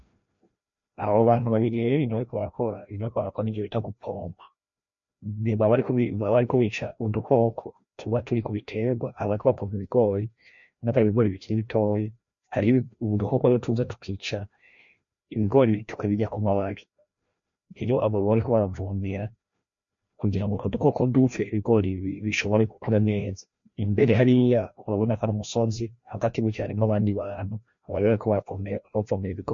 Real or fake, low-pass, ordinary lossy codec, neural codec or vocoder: fake; 7.2 kHz; MP3, 48 kbps; codec, 16 kHz, 1 kbps, FreqCodec, larger model